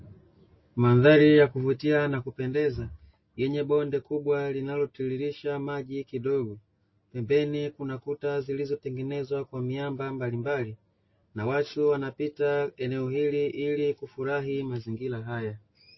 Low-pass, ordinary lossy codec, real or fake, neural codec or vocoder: 7.2 kHz; MP3, 24 kbps; real; none